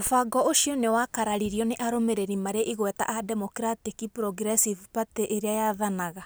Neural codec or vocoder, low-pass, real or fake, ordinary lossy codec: none; none; real; none